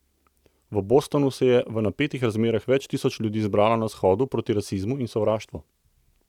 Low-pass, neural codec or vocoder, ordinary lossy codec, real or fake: 19.8 kHz; none; none; real